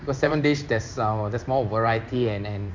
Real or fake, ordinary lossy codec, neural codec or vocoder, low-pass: fake; MP3, 64 kbps; codec, 16 kHz in and 24 kHz out, 1 kbps, XY-Tokenizer; 7.2 kHz